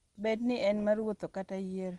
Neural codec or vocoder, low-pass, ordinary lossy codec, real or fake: none; 10.8 kHz; Opus, 24 kbps; real